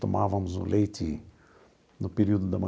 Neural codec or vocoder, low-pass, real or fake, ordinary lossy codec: none; none; real; none